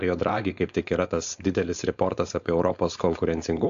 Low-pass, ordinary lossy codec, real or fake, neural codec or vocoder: 7.2 kHz; AAC, 64 kbps; fake; codec, 16 kHz, 4.8 kbps, FACodec